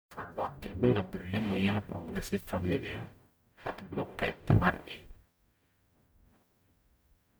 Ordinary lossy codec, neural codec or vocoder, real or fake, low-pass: none; codec, 44.1 kHz, 0.9 kbps, DAC; fake; none